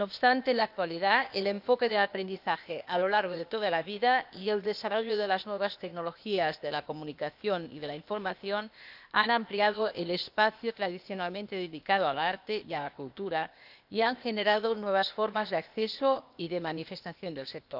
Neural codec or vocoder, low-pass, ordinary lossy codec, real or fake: codec, 16 kHz, 0.8 kbps, ZipCodec; 5.4 kHz; none; fake